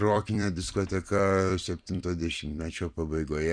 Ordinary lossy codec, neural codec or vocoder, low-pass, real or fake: MP3, 96 kbps; vocoder, 44.1 kHz, 128 mel bands every 256 samples, BigVGAN v2; 9.9 kHz; fake